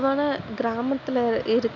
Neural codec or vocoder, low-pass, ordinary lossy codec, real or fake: none; 7.2 kHz; none; real